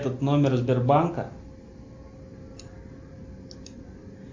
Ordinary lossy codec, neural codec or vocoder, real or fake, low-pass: MP3, 48 kbps; none; real; 7.2 kHz